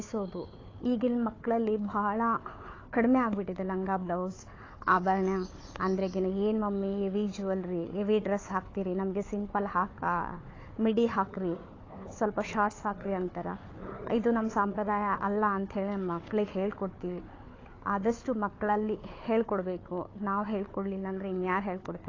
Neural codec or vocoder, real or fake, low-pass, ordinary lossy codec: codec, 16 kHz, 4 kbps, FunCodec, trained on Chinese and English, 50 frames a second; fake; 7.2 kHz; AAC, 32 kbps